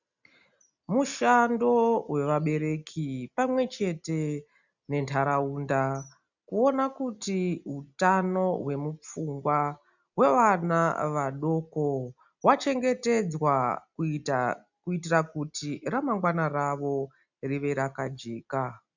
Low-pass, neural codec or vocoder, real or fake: 7.2 kHz; none; real